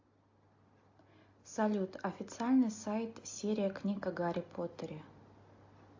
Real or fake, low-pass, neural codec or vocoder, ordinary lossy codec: real; 7.2 kHz; none; AAC, 48 kbps